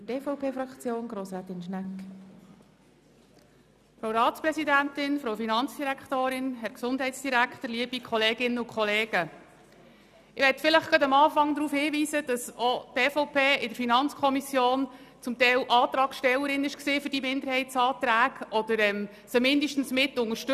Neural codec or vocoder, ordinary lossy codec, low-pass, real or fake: none; none; 14.4 kHz; real